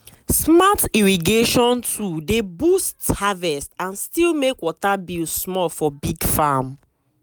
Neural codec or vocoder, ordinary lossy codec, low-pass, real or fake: none; none; none; real